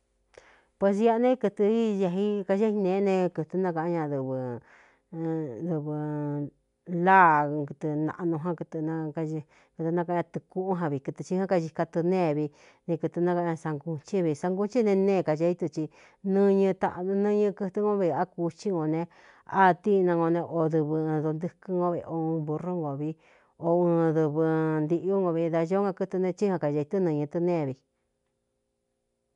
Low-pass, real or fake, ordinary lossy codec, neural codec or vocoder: 10.8 kHz; real; none; none